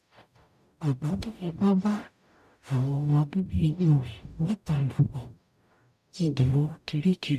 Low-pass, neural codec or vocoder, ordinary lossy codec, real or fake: 14.4 kHz; codec, 44.1 kHz, 0.9 kbps, DAC; none; fake